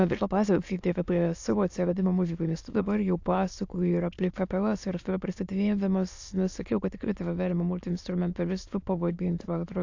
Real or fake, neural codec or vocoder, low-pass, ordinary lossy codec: fake; autoencoder, 22.05 kHz, a latent of 192 numbers a frame, VITS, trained on many speakers; 7.2 kHz; AAC, 48 kbps